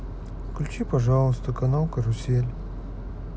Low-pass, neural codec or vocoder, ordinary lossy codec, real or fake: none; none; none; real